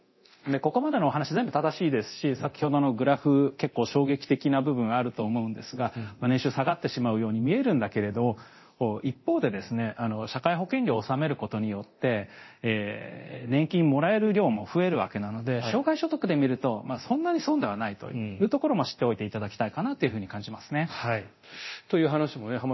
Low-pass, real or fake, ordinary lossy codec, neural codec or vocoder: 7.2 kHz; fake; MP3, 24 kbps; codec, 24 kHz, 0.9 kbps, DualCodec